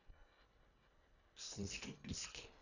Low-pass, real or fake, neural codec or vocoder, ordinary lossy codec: 7.2 kHz; fake; codec, 24 kHz, 1.5 kbps, HILCodec; none